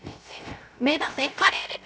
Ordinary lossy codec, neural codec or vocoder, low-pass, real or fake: none; codec, 16 kHz, 0.3 kbps, FocalCodec; none; fake